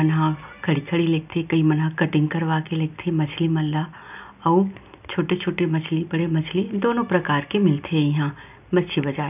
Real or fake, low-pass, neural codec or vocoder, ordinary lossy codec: real; 3.6 kHz; none; none